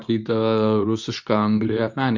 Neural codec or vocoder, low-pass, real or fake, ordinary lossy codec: codec, 24 kHz, 0.9 kbps, WavTokenizer, medium speech release version 2; 7.2 kHz; fake; MP3, 64 kbps